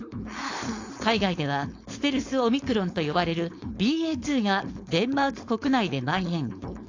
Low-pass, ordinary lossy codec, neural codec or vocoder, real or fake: 7.2 kHz; AAC, 48 kbps; codec, 16 kHz, 4.8 kbps, FACodec; fake